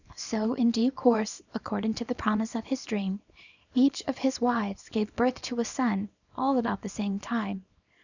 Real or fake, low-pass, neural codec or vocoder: fake; 7.2 kHz; codec, 24 kHz, 0.9 kbps, WavTokenizer, small release